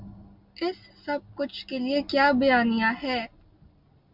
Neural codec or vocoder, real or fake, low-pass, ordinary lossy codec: none; real; 5.4 kHz; MP3, 48 kbps